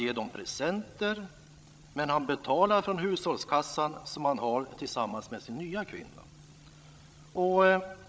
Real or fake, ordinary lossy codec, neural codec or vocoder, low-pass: fake; none; codec, 16 kHz, 16 kbps, FreqCodec, larger model; none